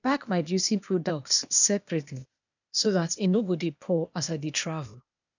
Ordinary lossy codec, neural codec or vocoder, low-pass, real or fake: none; codec, 16 kHz, 0.8 kbps, ZipCodec; 7.2 kHz; fake